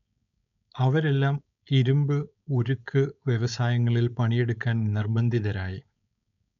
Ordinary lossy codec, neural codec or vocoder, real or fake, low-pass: none; codec, 16 kHz, 4.8 kbps, FACodec; fake; 7.2 kHz